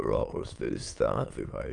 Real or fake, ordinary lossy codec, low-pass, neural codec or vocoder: fake; Opus, 32 kbps; 9.9 kHz; autoencoder, 22.05 kHz, a latent of 192 numbers a frame, VITS, trained on many speakers